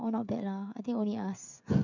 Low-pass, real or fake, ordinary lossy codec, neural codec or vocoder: 7.2 kHz; fake; none; codec, 16 kHz, 16 kbps, FreqCodec, smaller model